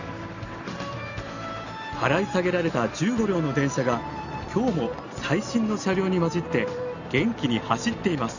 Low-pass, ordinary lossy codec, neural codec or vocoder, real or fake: 7.2 kHz; none; none; real